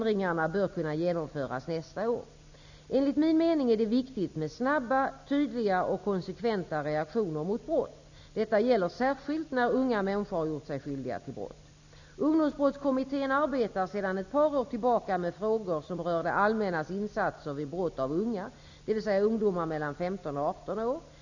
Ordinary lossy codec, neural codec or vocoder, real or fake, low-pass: none; none; real; 7.2 kHz